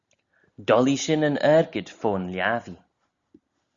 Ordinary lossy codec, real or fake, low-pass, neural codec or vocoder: Opus, 64 kbps; real; 7.2 kHz; none